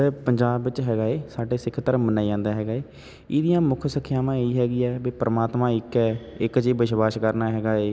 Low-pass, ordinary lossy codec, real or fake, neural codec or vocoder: none; none; real; none